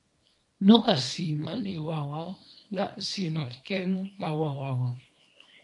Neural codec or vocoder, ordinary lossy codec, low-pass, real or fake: codec, 24 kHz, 0.9 kbps, WavTokenizer, small release; MP3, 48 kbps; 10.8 kHz; fake